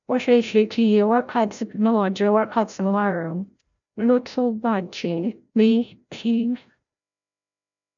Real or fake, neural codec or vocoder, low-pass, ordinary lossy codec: fake; codec, 16 kHz, 0.5 kbps, FreqCodec, larger model; 7.2 kHz; none